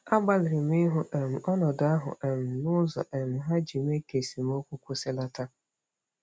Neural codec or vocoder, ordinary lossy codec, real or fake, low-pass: none; none; real; none